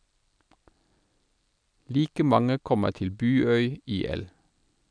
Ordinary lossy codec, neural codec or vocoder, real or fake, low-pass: none; none; real; 9.9 kHz